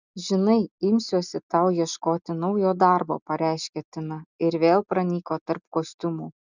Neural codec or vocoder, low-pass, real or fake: none; 7.2 kHz; real